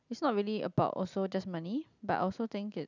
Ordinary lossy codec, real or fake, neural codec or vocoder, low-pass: none; real; none; 7.2 kHz